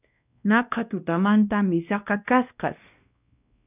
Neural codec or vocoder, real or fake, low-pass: codec, 16 kHz, 1 kbps, X-Codec, WavLM features, trained on Multilingual LibriSpeech; fake; 3.6 kHz